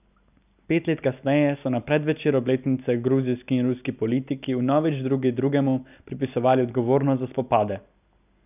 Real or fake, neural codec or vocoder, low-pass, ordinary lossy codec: real; none; 3.6 kHz; none